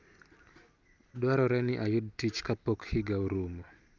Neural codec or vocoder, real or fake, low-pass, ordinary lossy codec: none; real; 7.2 kHz; Opus, 32 kbps